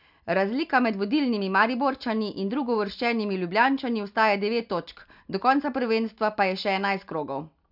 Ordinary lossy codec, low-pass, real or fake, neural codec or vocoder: none; 5.4 kHz; real; none